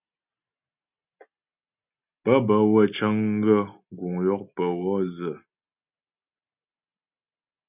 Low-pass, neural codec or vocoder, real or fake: 3.6 kHz; none; real